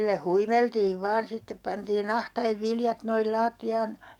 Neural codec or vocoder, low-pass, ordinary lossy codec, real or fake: codec, 44.1 kHz, 7.8 kbps, DAC; 19.8 kHz; none; fake